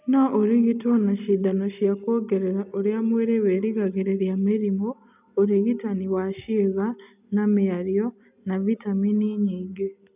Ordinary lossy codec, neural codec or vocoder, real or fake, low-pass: none; none; real; 3.6 kHz